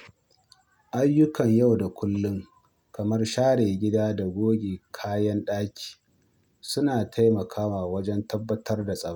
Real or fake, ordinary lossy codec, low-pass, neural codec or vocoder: real; none; none; none